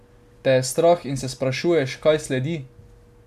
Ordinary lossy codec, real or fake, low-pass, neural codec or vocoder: MP3, 96 kbps; real; 14.4 kHz; none